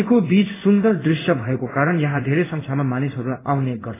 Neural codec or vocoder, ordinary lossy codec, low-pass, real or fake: codec, 16 kHz in and 24 kHz out, 1 kbps, XY-Tokenizer; AAC, 16 kbps; 3.6 kHz; fake